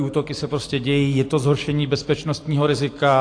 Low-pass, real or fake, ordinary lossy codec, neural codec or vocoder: 9.9 kHz; real; AAC, 48 kbps; none